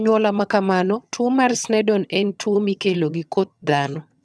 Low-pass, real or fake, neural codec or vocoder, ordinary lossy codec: none; fake; vocoder, 22.05 kHz, 80 mel bands, HiFi-GAN; none